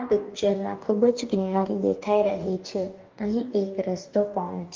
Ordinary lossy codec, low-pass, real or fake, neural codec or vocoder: Opus, 32 kbps; 7.2 kHz; fake; codec, 44.1 kHz, 2.6 kbps, DAC